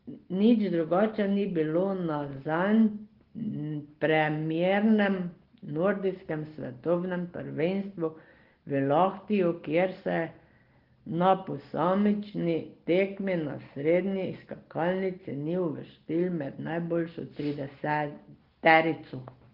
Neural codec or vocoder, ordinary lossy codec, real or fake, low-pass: none; Opus, 16 kbps; real; 5.4 kHz